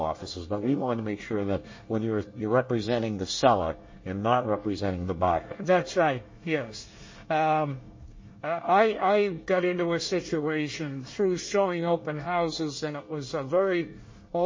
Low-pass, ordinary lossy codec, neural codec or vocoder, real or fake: 7.2 kHz; MP3, 32 kbps; codec, 24 kHz, 1 kbps, SNAC; fake